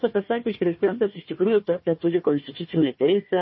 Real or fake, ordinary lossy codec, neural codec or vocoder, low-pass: fake; MP3, 24 kbps; codec, 16 kHz, 1 kbps, FunCodec, trained on Chinese and English, 50 frames a second; 7.2 kHz